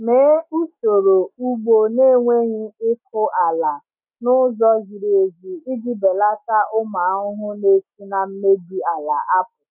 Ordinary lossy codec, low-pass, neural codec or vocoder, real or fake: none; 3.6 kHz; none; real